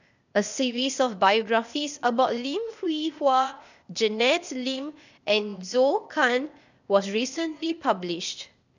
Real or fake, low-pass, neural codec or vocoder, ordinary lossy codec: fake; 7.2 kHz; codec, 16 kHz, 0.8 kbps, ZipCodec; none